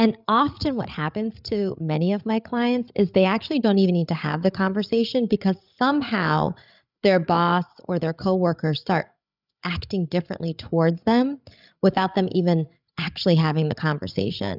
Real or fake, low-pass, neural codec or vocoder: fake; 5.4 kHz; codec, 16 kHz, 8 kbps, FreqCodec, larger model